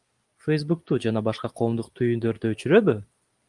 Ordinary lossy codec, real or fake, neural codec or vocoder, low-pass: Opus, 32 kbps; real; none; 10.8 kHz